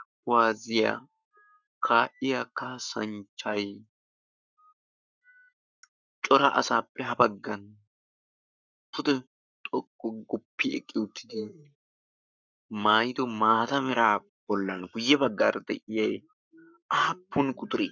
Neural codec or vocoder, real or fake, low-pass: codec, 44.1 kHz, 7.8 kbps, Pupu-Codec; fake; 7.2 kHz